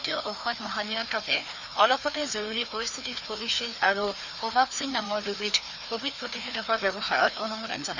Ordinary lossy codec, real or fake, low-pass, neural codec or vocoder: none; fake; 7.2 kHz; codec, 16 kHz, 2 kbps, FreqCodec, larger model